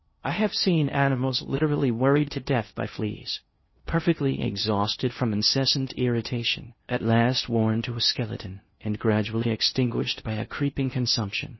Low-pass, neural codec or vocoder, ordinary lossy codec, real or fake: 7.2 kHz; codec, 16 kHz in and 24 kHz out, 0.6 kbps, FocalCodec, streaming, 2048 codes; MP3, 24 kbps; fake